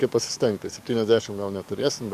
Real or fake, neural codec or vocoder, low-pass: fake; codec, 44.1 kHz, 7.8 kbps, DAC; 14.4 kHz